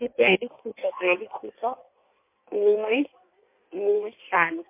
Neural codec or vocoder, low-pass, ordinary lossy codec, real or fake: codec, 16 kHz in and 24 kHz out, 1.1 kbps, FireRedTTS-2 codec; 3.6 kHz; MP3, 24 kbps; fake